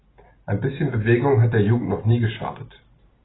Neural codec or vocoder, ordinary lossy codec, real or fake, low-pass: none; AAC, 16 kbps; real; 7.2 kHz